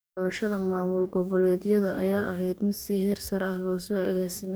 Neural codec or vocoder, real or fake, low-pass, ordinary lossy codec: codec, 44.1 kHz, 2.6 kbps, DAC; fake; none; none